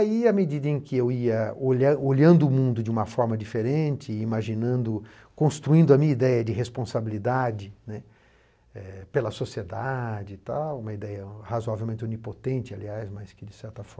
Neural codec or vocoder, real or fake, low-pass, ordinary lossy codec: none; real; none; none